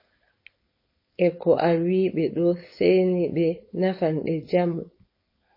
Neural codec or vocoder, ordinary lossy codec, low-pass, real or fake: codec, 16 kHz, 4.8 kbps, FACodec; MP3, 24 kbps; 5.4 kHz; fake